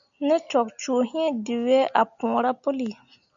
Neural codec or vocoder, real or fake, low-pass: none; real; 7.2 kHz